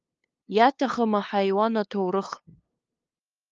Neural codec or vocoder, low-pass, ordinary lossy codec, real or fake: codec, 16 kHz, 2 kbps, FunCodec, trained on LibriTTS, 25 frames a second; 7.2 kHz; Opus, 24 kbps; fake